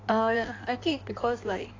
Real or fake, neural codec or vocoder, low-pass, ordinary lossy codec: fake; codec, 16 kHz in and 24 kHz out, 1.1 kbps, FireRedTTS-2 codec; 7.2 kHz; AAC, 32 kbps